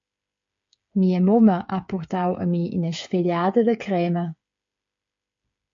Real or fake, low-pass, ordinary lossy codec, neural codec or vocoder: fake; 7.2 kHz; MP3, 48 kbps; codec, 16 kHz, 8 kbps, FreqCodec, smaller model